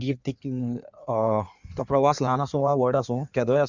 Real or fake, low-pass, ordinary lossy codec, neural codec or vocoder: fake; 7.2 kHz; Opus, 64 kbps; codec, 16 kHz in and 24 kHz out, 1.1 kbps, FireRedTTS-2 codec